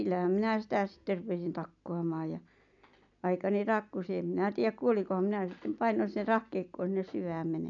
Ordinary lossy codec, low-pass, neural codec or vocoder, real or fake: none; 7.2 kHz; none; real